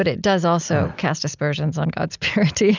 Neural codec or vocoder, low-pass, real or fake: none; 7.2 kHz; real